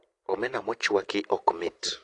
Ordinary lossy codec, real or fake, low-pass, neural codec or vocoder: AAC, 48 kbps; real; 10.8 kHz; none